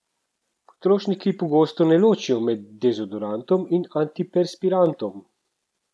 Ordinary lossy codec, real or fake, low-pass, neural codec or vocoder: none; real; none; none